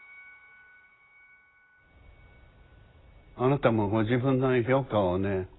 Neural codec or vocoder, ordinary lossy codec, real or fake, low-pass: none; AAC, 16 kbps; real; 7.2 kHz